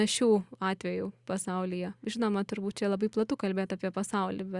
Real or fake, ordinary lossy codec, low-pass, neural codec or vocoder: real; Opus, 64 kbps; 10.8 kHz; none